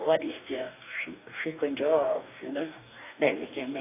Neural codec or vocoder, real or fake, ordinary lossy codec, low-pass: codec, 44.1 kHz, 2.6 kbps, DAC; fake; AAC, 24 kbps; 3.6 kHz